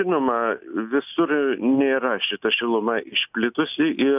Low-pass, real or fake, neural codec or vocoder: 3.6 kHz; real; none